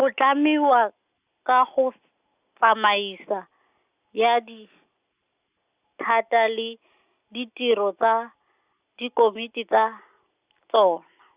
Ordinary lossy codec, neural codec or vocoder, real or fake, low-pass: Opus, 64 kbps; none; real; 3.6 kHz